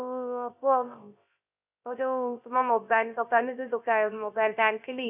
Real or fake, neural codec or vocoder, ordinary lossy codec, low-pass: fake; codec, 16 kHz, 0.3 kbps, FocalCodec; none; 3.6 kHz